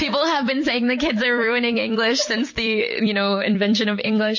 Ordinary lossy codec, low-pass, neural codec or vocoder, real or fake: MP3, 32 kbps; 7.2 kHz; vocoder, 44.1 kHz, 128 mel bands every 256 samples, BigVGAN v2; fake